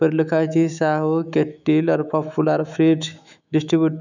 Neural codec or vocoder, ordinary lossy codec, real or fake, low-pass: autoencoder, 48 kHz, 128 numbers a frame, DAC-VAE, trained on Japanese speech; none; fake; 7.2 kHz